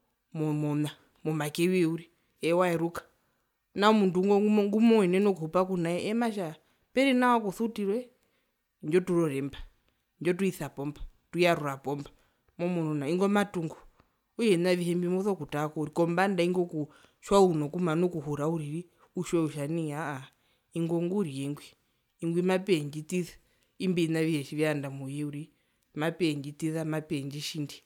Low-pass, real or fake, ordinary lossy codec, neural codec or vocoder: 19.8 kHz; real; none; none